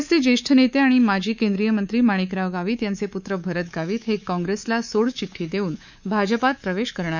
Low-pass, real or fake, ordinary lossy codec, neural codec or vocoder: 7.2 kHz; fake; none; codec, 24 kHz, 3.1 kbps, DualCodec